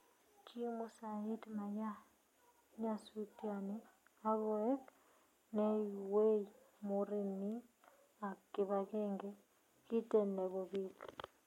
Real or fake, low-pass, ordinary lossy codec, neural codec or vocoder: real; 19.8 kHz; MP3, 64 kbps; none